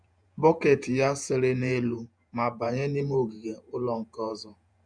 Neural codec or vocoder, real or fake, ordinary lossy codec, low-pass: vocoder, 48 kHz, 128 mel bands, Vocos; fake; none; 9.9 kHz